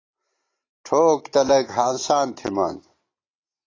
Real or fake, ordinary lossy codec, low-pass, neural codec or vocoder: real; AAC, 32 kbps; 7.2 kHz; none